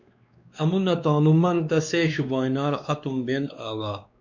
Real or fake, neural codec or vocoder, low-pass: fake; codec, 16 kHz, 2 kbps, X-Codec, WavLM features, trained on Multilingual LibriSpeech; 7.2 kHz